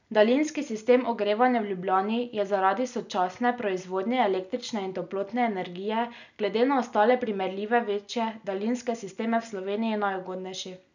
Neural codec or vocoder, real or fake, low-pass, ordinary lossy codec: none; real; 7.2 kHz; none